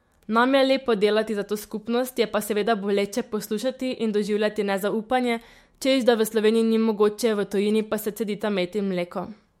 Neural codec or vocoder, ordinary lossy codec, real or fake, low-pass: autoencoder, 48 kHz, 128 numbers a frame, DAC-VAE, trained on Japanese speech; MP3, 64 kbps; fake; 19.8 kHz